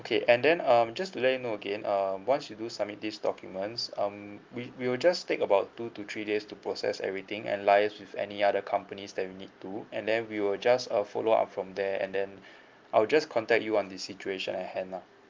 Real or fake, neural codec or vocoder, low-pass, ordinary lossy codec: real; none; 7.2 kHz; Opus, 24 kbps